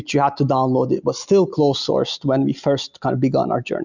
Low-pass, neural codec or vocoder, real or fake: 7.2 kHz; none; real